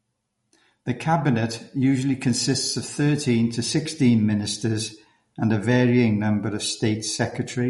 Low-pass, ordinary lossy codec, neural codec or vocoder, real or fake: 19.8 kHz; MP3, 48 kbps; none; real